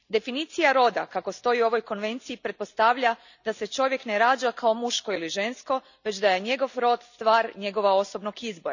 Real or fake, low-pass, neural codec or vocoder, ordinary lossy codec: real; 7.2 kHz; none; none